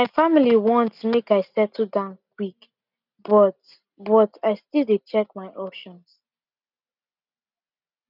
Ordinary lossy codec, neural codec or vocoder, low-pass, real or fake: none; none; 5.4 kHz; real